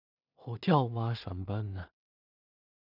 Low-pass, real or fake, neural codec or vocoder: 5.4 kHz; fake; codec, 16 kHz in and 24 kHz out, 0.4 kbps, LongCat-Audio-Codec, two codebook decoder